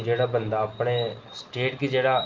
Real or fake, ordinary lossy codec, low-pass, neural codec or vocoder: real; Opus, 32 kbps; 7.2 kHz; none